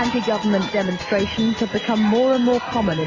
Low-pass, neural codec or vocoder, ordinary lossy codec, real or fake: 7.2 kHz; none; MP3, 64 kbps; real